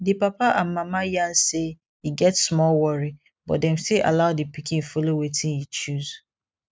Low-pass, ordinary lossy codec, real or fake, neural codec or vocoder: none; none; real; none